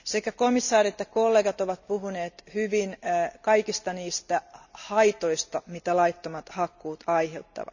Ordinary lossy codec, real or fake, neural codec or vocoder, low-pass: none; real; none; 7.2 kHz